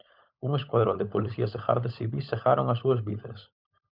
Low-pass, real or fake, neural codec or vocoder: 5.4 kHz; fake; codec, 16 kHz, 16 kbps, FunCodec, trained on LibriTTS, 50 frames a second